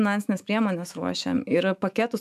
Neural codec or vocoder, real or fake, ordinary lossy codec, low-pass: autoencoder, 48 kHz, 128 numbers a frame, DAC-VAE, trained on Japanese speech; fake; AAC, 96 kbps; 14.4 kHz